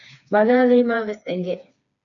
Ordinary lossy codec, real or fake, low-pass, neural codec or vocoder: MP3, 96 kbps; fake; 7.2 kHz; codec, 16 kHz, 4 kbps, FreqCodec, smaller model